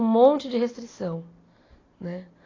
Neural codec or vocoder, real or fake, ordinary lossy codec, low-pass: none; real; AAC, 32 kbps; 7.2 kHz